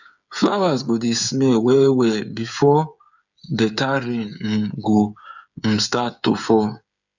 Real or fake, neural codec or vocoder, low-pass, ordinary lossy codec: fake; codec, 16 kHz, 8 kbps, FreqCodec, smaller model; 7.2 kHz; none